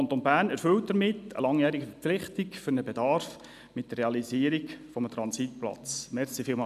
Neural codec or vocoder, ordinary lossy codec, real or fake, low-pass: none; none; real; 14.4 kHz